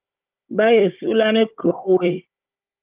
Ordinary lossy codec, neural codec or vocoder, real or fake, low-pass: Opus, 32 kbps; codec, 16 kHz, 16 kbps, FunCodec, trained on Chinese and English, 50 frames a second; fake; 3.6 kHz